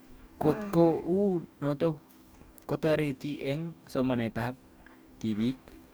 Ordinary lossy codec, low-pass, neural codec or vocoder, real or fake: none; none; codec, 44.1 kHz, 2.6 kbps, DAC; fake